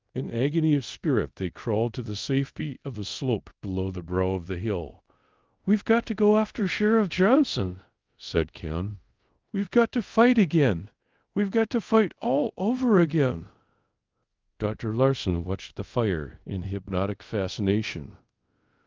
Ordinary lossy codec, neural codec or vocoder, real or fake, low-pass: Opus, 32 kbps; codec, 24 kHz, 0.5 kbps, DualCodec; fake; 7.2 kHz